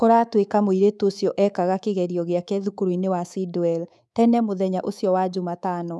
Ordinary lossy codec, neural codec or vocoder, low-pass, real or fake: none; codec, 24 kHz, 3.1 kbps, DualCodec; none; fake